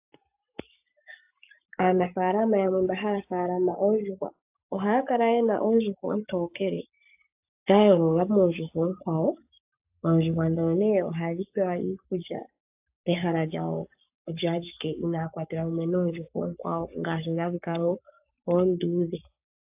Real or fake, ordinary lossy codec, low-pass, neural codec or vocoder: fake; AAC, 32 kbps; 3.6 kHz; codec, 44.1 kHz, 7.8 kbps, Pupu-Codec